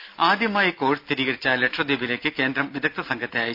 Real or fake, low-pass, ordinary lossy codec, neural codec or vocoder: real; 5.4 kHz; none; none